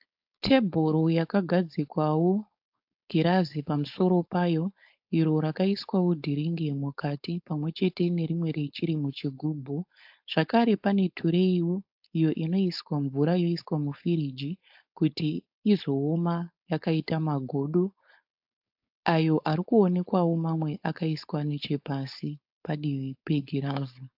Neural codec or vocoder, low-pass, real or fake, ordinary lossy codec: codec, 16 kHz, 4.8 kbps, FACodec; 5.4 kHz; fake; AAC, 48 kbps